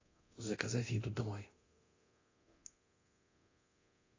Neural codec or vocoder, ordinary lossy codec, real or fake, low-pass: codec, 24 kHz, 0.9 kbps, DualCodec; AAC, 32 kbps; fake; 7.2 kHz